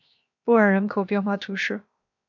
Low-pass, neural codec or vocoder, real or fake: 7.2 kHz; codec, 16 kHz, 0.7 kbps, FocalCodec; fake